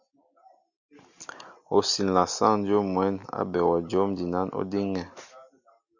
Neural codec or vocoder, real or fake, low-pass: none; real; 7.2 kHz